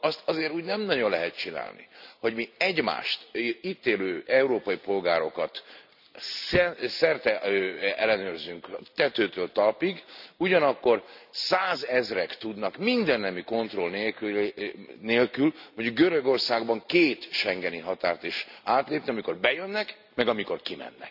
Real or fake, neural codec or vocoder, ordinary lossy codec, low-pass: real; none; none; 5.4 kHz